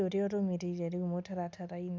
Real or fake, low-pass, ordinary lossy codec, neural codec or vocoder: real; none; none; none